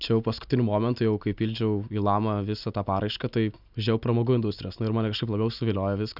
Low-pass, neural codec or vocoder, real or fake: 5.4 kHz; none; real